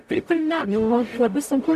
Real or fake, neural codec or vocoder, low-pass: fake; codec, 44.1 kHz, 0.9 kbps, DAC; 14.4 kHz